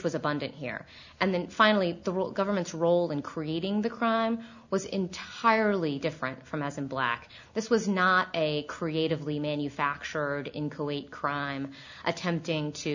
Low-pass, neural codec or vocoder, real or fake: 7.2 kHz; none; real